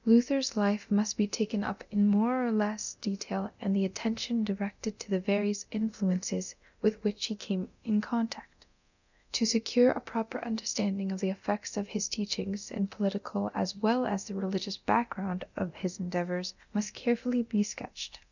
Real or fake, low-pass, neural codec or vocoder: fake; 7.2 kHz; codec, 24 kHz, 0.9 kbps, DualCodec